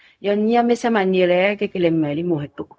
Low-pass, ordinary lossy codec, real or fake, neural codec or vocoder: none; none; fake; codec, 16 kHz, 0.4 kbps, LongCat-Audio-Codec